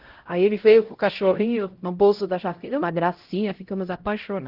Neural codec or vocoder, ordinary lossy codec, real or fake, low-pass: codec, 16 kHz, 0.5 kbps, X-Codec, HuBERT features, trained on LibriSpeech; Opus, 16 kbps; fake; 5.4 kHz